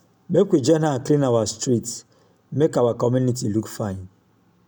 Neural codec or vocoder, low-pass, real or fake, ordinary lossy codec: none; none; real; none